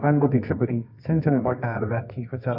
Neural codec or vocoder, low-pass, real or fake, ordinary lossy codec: codec, 24 kHz, 0.9 kbps, WavTokenizer, medium music audio release; 5.4 kHz; fake; AAC, 48 kbps